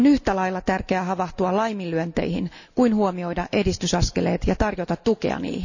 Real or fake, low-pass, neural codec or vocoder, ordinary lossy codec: real; 7.2 kHz; none; none